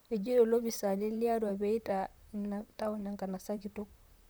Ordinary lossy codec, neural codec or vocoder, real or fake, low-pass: none; vocoder, 44.1 kHz, 128 mel bands, Pupu-Vocoder; fake; none